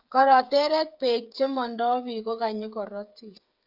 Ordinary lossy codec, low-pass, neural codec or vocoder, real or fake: none; 5.4 kHz; codec, 16 kHz, 8 kbps, FreqCodec, smaller model; fake